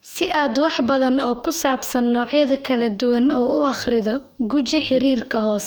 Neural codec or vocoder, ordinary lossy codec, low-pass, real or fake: codec, 44.1 kHz, 2.6 kbps, DAC; none; none; fake